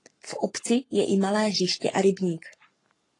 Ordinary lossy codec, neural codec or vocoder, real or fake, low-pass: AAC, 32 kbps; codec, 44.1 kHz, 7.8 kbps, Pupu-Codec; fake; 10.8 kHz